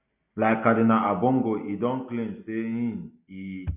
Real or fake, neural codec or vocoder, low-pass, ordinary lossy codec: real; none; 3.6 kHz; none